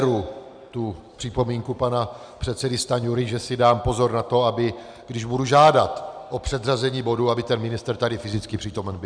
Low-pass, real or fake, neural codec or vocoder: 9.9 kHz; real; none